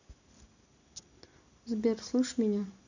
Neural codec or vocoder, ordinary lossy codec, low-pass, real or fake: none; none; 7.2 kHz; real